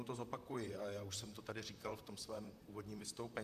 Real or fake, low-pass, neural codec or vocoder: fake; 14.4 kHz; vocoder, 44.1 kHz, 128 mel bands, Pupu-Vocoder